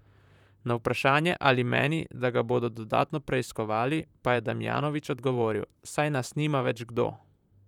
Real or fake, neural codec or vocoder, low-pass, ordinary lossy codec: fake; vocoder, 44.1 kHz, 128 mel bands, Pupu-Vocoder; 19.8 kHz; none